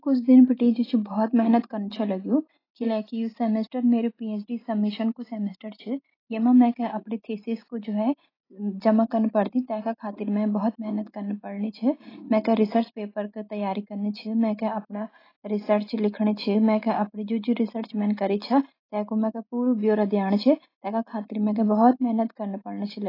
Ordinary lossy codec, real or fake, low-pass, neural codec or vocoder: AAC, 24 kbps; real; 5.4 kHz; none